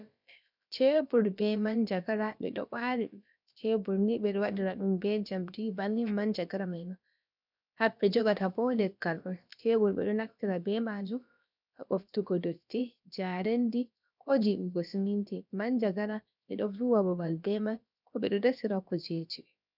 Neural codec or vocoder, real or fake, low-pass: codec, 16 kHz, about 1 kbps, DyCAST, with the encoder's durations; fake; 5.4 kHz